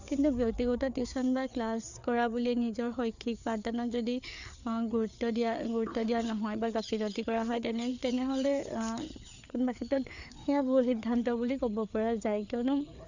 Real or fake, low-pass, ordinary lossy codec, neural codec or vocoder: fake; 7.2 kHz; none; codec, 16 kHz, 4 kbps, FreqCodec, larger model